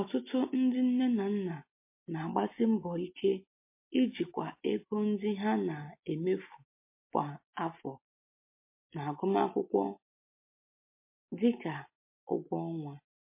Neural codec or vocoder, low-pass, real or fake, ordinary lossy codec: none; 3.6 kHz; real; MP3, 24 kbps